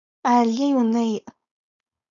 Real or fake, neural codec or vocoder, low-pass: fake; codec, 16 kHz, 4.8 kbps, FACodec; 7.2 kHz